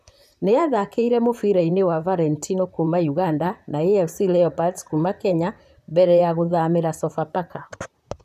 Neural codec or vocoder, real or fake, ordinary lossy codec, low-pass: vocoder, 44.1 kHz, 128 mel bands, Pupu-Vocoder; fake; none; 14.4 kHz